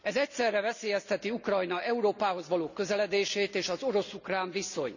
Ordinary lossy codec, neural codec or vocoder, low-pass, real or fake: none; none; 7.2 kHz; real